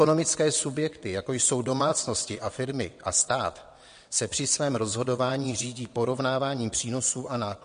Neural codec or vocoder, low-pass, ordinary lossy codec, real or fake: vocoder, 22.05 kHz, 80 mel bands, WaveNeXt; 9.9 kHz; MP3, 48 kbps; fake